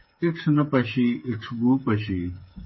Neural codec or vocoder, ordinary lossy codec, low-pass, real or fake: codec, 16 kHz, 8 kbps, FreqCodec, smaller model; MP3, 24 kbps; 7.2 kHz; fake